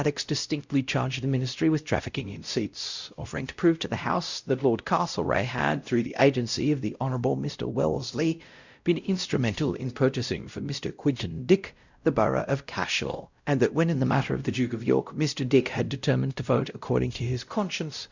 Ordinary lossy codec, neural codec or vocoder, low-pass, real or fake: Opus, 64 kbps; codec, 16 kHz, 0.5 kbps, X-Codec, WavLM features, trained on Multilingual LibriSpeech; 7.2 kHz; fake